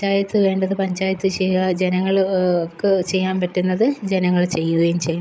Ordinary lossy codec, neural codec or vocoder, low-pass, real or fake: none; codec, 16 kHz, 16 kbps, FreqCodec, larger model; none; fake